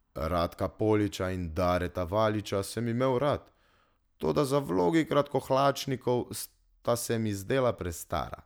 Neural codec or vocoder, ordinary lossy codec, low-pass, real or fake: none; none; none; real